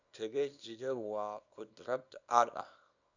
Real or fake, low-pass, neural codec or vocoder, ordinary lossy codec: fake; 7.2 kHz; codec, 24 kHz, 0.9 kbps, WavTokenizer, small release; none